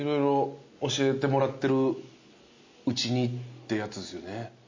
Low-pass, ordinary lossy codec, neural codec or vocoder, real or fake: 7.2 kHz; MP3, 32 kbps; none; real